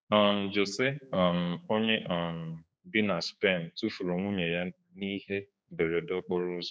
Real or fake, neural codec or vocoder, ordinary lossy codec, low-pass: fake; codec, 16 kHz, 4 kbps, X-Codec, HuBERT features, trained on general audio; none; none